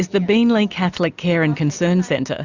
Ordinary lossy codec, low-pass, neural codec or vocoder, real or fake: Opus, 64 kbps; 7.2 kHz; none; real